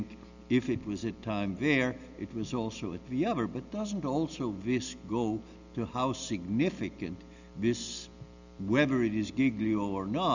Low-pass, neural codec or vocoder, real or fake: 7.2 kHz; none; real